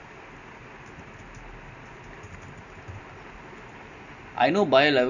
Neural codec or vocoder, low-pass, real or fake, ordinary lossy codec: none; 7.2 kHz; real; none